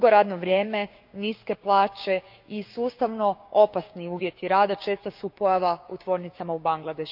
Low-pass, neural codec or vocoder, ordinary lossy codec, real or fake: 5.4 kHz; codec, 16 kHz, 6 kbps, DAC; none; fake